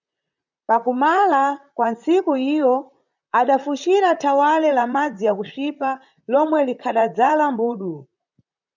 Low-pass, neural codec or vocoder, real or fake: 7.2 kHz; vocoder, 44.1 kHz, 128 mel bands, Pupu-Vocoder; fake